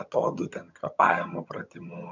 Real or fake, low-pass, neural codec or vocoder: fake; 7.2 kHz; vocoder, 22.05 kHz, 80 mel bands, HiFi-GAN